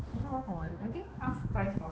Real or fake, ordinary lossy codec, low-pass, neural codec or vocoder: fake; none; none; codec, 16 kHz, 2 kbps, X-Codec, HuBERT features, trained on balanced general audio